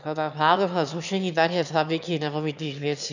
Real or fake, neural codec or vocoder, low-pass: fake; autoencoder, 22.05 kHz, a latent of 192 numbers a frame, VITS, trained on one speaker; 7.2 kHz